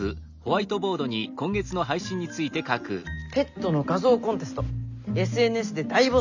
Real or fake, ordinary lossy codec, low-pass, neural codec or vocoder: real; none; 7.2 kHz; none